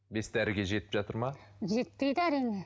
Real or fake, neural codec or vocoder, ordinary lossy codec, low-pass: real; none; none; none